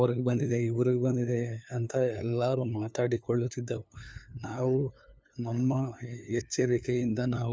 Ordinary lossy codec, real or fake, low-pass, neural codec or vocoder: none; fake; none; codec, 16 kHz, 2 kbps, FunCodec, trained on LibriTTS, 25 frames a second